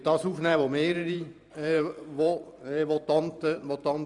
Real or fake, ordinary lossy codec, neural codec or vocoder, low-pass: fake; none; vocoder, 44.1 kHz, 128 mel bands every 512 samples, BigVGAN v2; 10.8 kHz